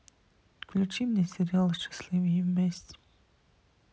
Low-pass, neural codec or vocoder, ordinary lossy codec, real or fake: none; none; none; real